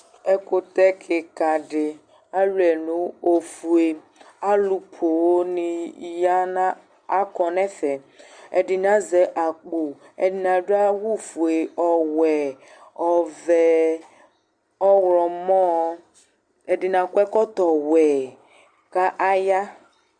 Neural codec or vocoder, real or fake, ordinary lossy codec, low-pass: none; real; Opus, 64 kbps; 9.9 kHz